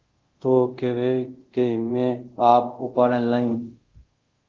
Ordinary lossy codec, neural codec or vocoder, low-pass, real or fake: Opus, 16 kbps; codec, 24 kHz, 0.5 kbps, DualCodec; 7.2 kHz; fake